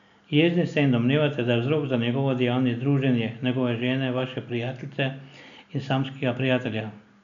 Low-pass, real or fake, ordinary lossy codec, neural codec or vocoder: 7.2 kHz; real; none; none